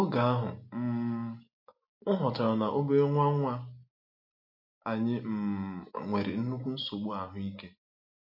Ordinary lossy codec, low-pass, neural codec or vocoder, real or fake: MP3, 32 kbps; 5.4 kHz; none; real